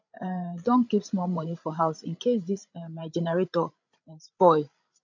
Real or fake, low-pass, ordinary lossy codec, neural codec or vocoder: fake; 7.2 kHz; none; codec, 16 kHz, 16 kbps, FreqCodec, larger model